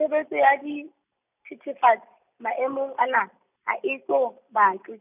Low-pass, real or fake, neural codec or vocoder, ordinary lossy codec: 3.6 kHz; real; none; none